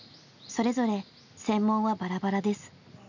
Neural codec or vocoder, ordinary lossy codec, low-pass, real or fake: none; none; 7.2 kHz; real